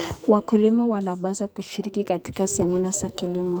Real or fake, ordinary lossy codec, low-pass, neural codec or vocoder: fake; none; none; codec, 44.1 kHz, 2.6 kbps, SNAC